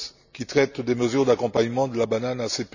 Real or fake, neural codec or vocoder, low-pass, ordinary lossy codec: real; none; 7.2 kHz; none